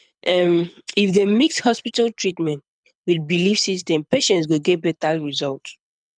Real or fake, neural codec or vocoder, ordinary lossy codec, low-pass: fake; codec, 24 kHz, 6 kbps, HILCodec; none; 9.9 kHz